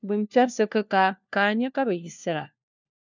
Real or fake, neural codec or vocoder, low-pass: fake; codec, 16 kHz, 1 kbps, FunCodec, trained on LibriTTS, 50 frames a second; 7.2 kHz